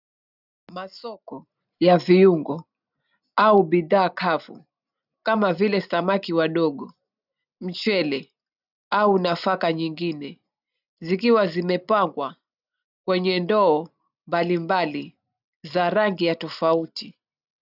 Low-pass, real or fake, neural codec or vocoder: 5.4 kHz; real; none